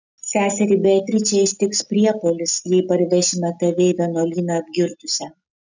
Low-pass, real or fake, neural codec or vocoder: 7.2 kHz; real; none